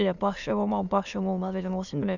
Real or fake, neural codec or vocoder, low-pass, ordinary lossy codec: fake; autoencoder, 22.05 kHz, a latent of 192 numbers a frame, VITS, trained on many speakers; 7.2 kHz; none